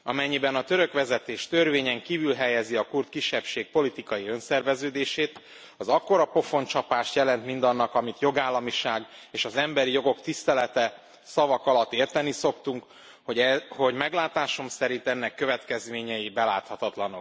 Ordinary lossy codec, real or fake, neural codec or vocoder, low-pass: none; real; none; none